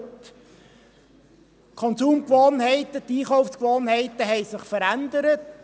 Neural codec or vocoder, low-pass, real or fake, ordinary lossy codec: none; none; real; none